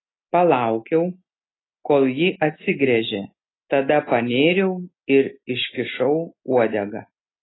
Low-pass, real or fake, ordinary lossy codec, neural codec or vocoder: 7.2 kHz; real; AAC, 16 kbps; none